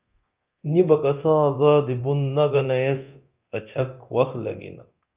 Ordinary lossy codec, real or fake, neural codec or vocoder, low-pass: Opus, 24 kbps; fake; codec, 24 kHz, 0.9 kbps, DualCodec; 3.6 kHz